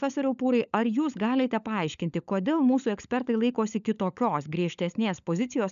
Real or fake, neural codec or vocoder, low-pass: fake; codec, 16 kHz, 16 kbps, FunCodec, trained on LibriTTS, 50 frames a second; 7.2 kHz